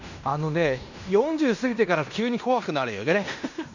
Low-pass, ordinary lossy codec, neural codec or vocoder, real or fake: 7.2 kHz; none; codec, 16 kHz in and 24 kHz out, 0.9 kbps, LongCat-Audio-Codec, fine tuned four codebook decoder; fake